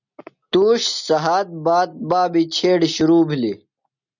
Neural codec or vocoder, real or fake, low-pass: none; real; 7.2 kHz